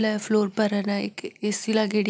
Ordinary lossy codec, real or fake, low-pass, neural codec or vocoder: none; real; none; none